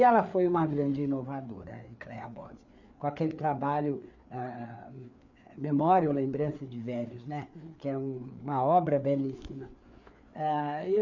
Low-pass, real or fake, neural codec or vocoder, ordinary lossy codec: 7.2 kHz; fake; codec, 16 kHz, 4 kbps, FreqCodec, larger model; none